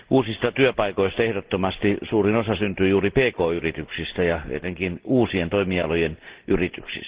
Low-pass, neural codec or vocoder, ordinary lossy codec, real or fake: 3.6 kHz; none; Opus, 16 kbps; real